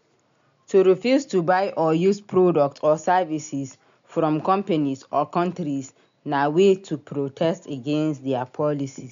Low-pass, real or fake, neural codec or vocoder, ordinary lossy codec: 7.2 kHz; real; none; MP3, 64 kbps